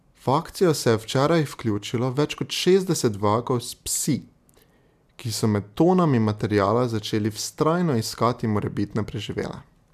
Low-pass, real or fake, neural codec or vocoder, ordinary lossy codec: 14.4 kHz; real; none; MP3, 96 kbps